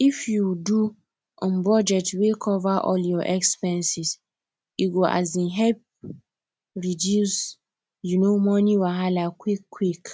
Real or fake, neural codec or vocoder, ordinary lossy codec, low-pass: real; none; none; none